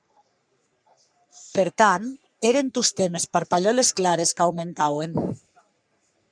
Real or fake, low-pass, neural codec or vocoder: fake; 9.9 kHz; codec, 44.1 kHz, 3.4 kbps, Pupu-Codec